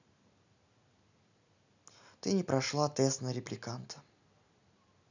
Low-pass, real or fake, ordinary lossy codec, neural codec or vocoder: 7.2 kHz; real; none; none